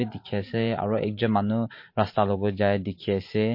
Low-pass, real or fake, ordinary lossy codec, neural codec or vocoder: 5.4 kHz; fake; MP3, 32 kbps; vocoder, 44.1 kHz, 128 mel bands every 512 samples, BigVGAN v2